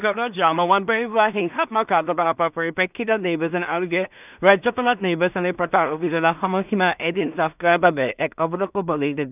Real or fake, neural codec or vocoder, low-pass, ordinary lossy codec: fake; codec, 16 kHz in and 24 kHz out, 0.4 kbps, LongCat-Audio-Codec, two codebook decoder; 3.6 kHz; none